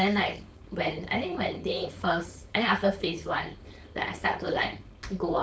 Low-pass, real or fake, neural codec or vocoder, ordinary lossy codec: none; fake; codec, 16 kHz, 4.8 kbps, FACodec; none